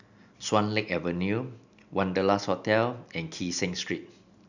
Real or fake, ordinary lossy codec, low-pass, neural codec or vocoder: real; none; 7.2 kHz; none